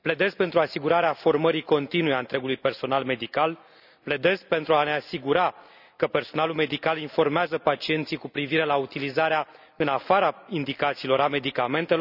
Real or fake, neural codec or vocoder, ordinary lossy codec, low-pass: real; none; none; 5.4 kHz